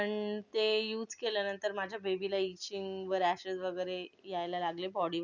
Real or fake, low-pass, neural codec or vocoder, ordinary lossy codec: real; 7.2 kHz; none; none